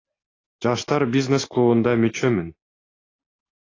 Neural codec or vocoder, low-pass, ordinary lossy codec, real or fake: none; 7.2 kHz; AAC, 32 kbps; real